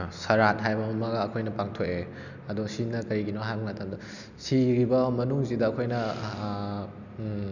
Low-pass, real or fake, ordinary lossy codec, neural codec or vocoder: 7.2 kHz; real; none; none